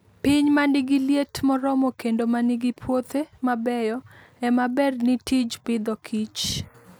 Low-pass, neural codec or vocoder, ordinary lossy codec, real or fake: none; none; none; real